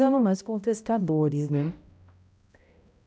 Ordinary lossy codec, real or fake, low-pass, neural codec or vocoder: none; fake; none; codec, 16 kHz, 0.5 kbps, X-Codec, HuBERT features, trained on balanced general audio